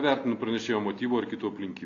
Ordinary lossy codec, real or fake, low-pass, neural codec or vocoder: AAC, 48 kbps; real; 7.2 kHz; none